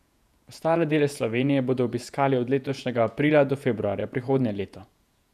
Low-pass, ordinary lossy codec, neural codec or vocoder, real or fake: 14.4 kHz; none; vocoder, 48 kHz, 128 mel bands, Vocos; fake